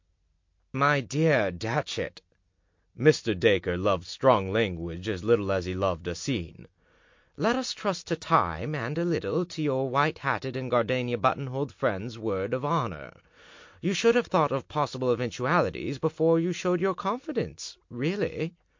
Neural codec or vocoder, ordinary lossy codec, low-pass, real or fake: none; MP3, 48 kbps; 7.2 kHz; real